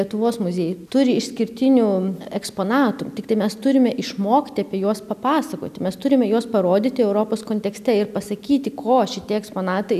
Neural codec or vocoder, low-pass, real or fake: none; 14.4 kHz; real